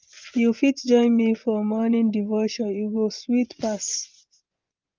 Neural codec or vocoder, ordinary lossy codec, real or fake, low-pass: vocoder, 24 kHz, 100 mel bands, Vocos; Opus, 24 kbps; fake; 7.2 kHz